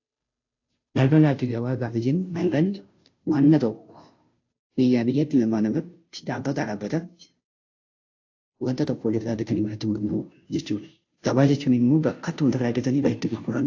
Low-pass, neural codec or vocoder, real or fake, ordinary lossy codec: 7.2 kHz; codec, 16 kHz, 0.5 kbps, FunCodec, trained on Chinese and English, 25 frames a second; fake; AAC, 48 kbps